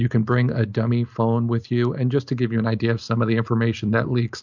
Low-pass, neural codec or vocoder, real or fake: 7.2 kHz; none; real